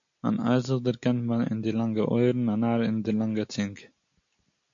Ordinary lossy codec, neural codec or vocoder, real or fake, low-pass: AAC, 64 kbps; none; real; 7.2 kHz